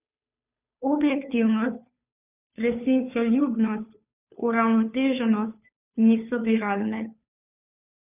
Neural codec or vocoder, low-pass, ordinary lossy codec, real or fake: codec, 16 kHz, 2 kbps, FunCodec, trained on Chinese and English, 25 frames a second; 3.6 kHz; none; fake